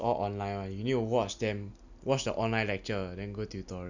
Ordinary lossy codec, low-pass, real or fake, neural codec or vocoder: none; 7.2 kHz; real; none